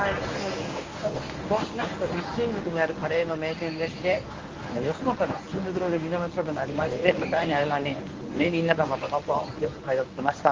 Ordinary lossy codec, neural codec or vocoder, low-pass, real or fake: Opus, 32 kbps; codec, 24 kHz, 0.9 kbps, WavTokenizer, medium speech release version 1; 7.2 kHz; fake